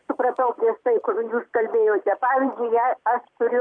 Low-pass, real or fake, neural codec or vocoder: 9.9 kHz; fake; vocoder, 44.1 kHz, 128 mel bands every 256 samples, BigVGAN v2